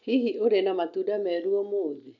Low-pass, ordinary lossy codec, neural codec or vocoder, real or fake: 7.2 kHz; none; none; real